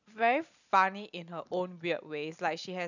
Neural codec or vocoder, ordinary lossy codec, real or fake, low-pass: none; none; real; 7.2 kHz